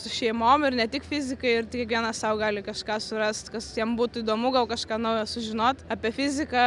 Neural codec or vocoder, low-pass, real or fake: none; 10.8 kHz; real